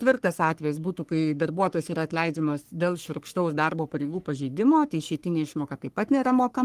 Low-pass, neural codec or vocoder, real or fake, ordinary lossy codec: 14.4 kHz; codec, 44.1 kHz, 3.4 kbps, Pupu-Codec; fake; Opus, 24 kbps